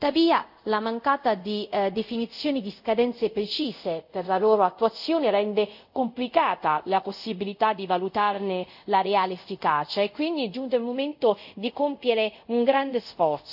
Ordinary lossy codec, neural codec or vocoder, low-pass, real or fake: AAC, 48 kbps; codec, 24 kHz, 0.5 kbps, DualCodec; 5.4 kHz; fake